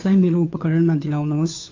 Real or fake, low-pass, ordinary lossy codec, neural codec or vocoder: fake; 7.2 kHz; AAC, 48 kbps; codec, 16 kHz, 2 kbps, FunCodec, trained on Chinese and English, 25 frames a second